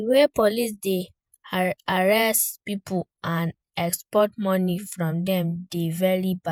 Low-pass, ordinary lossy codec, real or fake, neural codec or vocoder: none; none; fake; vocoder, 48 kHz, 128 mel bands, Vocos